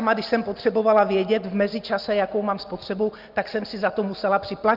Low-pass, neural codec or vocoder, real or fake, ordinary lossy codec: 5.4 kHz; none; real; Opus, 24 kbps